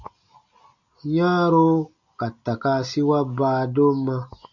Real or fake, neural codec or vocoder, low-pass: real; none; 7.2 kHz